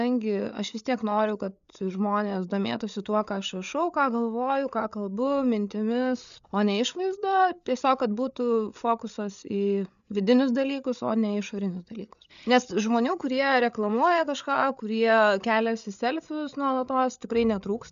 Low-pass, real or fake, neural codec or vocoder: 7.2 kHz; fake; codec, 16 kHz, 8 kbps, FreqCodec, larger model